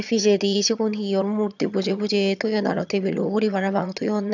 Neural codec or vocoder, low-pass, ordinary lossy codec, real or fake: vocoder, 22.05 kHz, 80 mel bands, HiFi-GAN; 7.2 kHz; none; fake